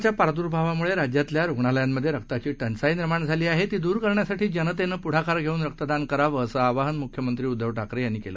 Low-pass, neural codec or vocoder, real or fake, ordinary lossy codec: none; none; real; none